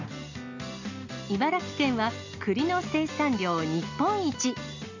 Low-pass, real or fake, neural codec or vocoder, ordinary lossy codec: 7.2 kHz; real; none; none